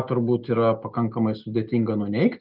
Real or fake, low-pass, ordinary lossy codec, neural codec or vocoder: real; 5.4 kHz; Opus, 24 kbps; none